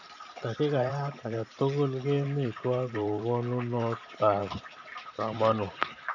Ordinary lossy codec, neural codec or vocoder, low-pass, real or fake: none; vocoder, 44.1 kHz, 128 mel bands every 512 samples, BigVGAN v2; 7.2 kHz; fake